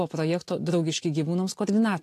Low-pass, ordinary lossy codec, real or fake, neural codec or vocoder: 14.4 kHz; AAC, 64 kbps; real; none